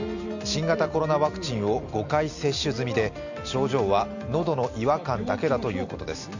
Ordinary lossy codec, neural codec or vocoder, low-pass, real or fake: none; none; 7.2 kHz; real